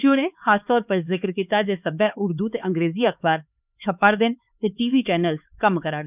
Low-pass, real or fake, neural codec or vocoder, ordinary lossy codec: 3.6 kHz; fake; codec, 16 kHz, 4 kbps, X-Codec, HuBERT features, trained on LibriSpeech; none